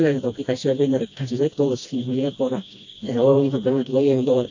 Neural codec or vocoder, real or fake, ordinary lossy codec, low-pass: codec, 16 kHz, 1 kbps, FreqCodec, smaller model; fake; AAC, 48 kbps; 7.2 kHz